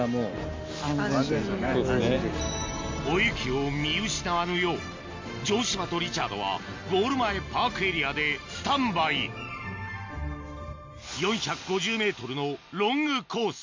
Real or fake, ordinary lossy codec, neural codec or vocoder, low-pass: real; MP3, 48 kbps; none; 7.2 kHz